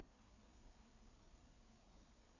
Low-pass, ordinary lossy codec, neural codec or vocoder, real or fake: 7.2 kHz; none; codec, 16 kHz, 4 kbps, FreqCodec, larger model; fake